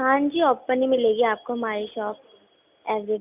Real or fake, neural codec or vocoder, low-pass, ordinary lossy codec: real; none; 3.6 kHz; none